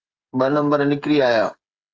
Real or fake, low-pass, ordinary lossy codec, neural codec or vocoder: fake; 7.2 kHz; Opus, 32 kbps; codec, 16 kHz, 8 kbps, FreqCodec, smaller model